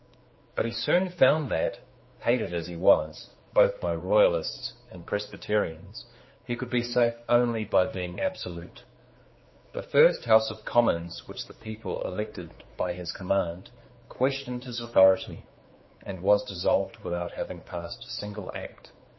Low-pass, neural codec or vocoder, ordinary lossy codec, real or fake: 7.2 kHz; codec, 16 kHz, 4 kbps, X-Codec, HuBERT features, trained on general audio; MP3, 24 kbps; fake